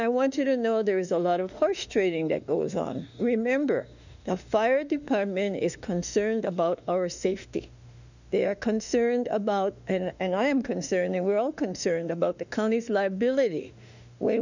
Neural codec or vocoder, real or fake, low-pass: autoencoder, 48 kHz, 32 numbers a frame, DAC-VAE, trained on Japanese speech; fake; 7.2 kHz